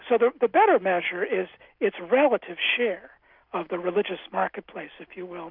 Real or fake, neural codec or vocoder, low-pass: real; none; 5.4 kHz